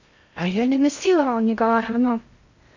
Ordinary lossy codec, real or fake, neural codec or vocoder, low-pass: Opus, 64 kbps; fake; codec, 16 kHz in and 24 kHz out, 0.6 kbps, FocalCodec, streaming, 2048 codes; 7.2 kHz